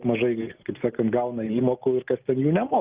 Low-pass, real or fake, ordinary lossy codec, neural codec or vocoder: 3.6 kHz; real; Opus, 24 kbps; none